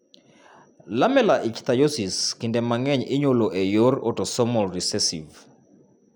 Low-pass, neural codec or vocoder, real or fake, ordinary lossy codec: none; none; real; none